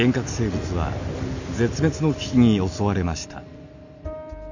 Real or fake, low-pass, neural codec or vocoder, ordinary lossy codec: real; 7.2 kHz; none; none